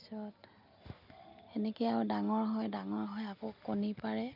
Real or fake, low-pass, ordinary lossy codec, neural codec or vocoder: real; 5.4 kHz; none; none